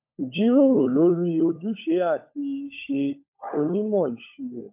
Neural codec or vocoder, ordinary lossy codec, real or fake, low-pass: codec, 16 kHz, 16 kbps, FunCodec, trained on LibriTTS, 50 frames a second; MP3, 32 kbps; fake; 3.6 kHz